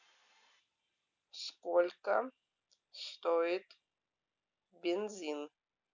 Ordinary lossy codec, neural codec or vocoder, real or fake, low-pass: none; none; real; 7.2 kHz